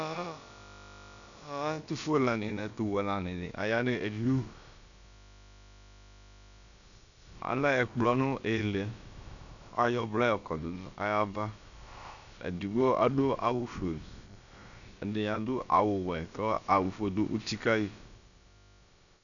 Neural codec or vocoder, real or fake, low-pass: codec, 16 kHz, about 1 kbps, DyCAST, with the encoder's durations; fake; 7.2 kHz